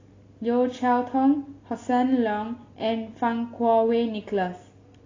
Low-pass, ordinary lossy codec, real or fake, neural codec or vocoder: 7.2 kHz; AAC, 32 kbps; real; none